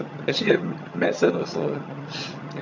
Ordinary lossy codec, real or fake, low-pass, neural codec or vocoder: none; fake; 7.2 kHz; vocoder, 22.05 kHz, 80 mel bands, HiFi-GAN